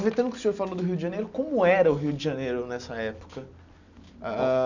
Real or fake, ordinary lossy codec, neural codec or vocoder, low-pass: real; none; none; 7.2 kHz